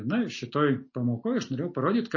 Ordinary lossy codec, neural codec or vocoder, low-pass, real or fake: MP3, 32 kbps; none; 7.2 kHz; real